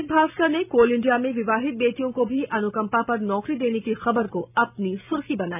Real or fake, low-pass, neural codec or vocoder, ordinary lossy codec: real; 3.6 kHz; none; none